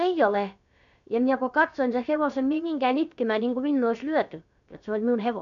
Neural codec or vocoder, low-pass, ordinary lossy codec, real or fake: codec, 16 kHz, about 1 kbps, DyCAST, with the encoder's durations; 7.2 kHz; none; fake